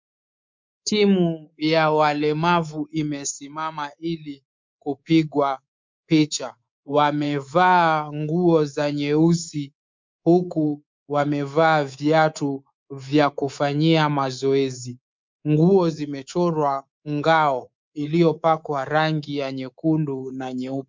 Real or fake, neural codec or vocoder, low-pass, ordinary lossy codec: fake; codec, 24 kHz, 3.1 kbps, DualCodec; 7.2 kHz; MP3, 64 kbps